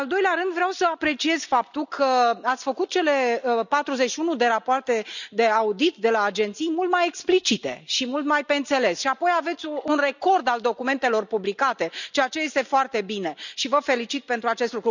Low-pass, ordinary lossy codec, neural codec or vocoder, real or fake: 7.2 kHz; none; none; real